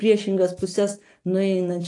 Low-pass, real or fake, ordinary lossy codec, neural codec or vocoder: 10.8 kHz; real; AAC, 48 kbps; none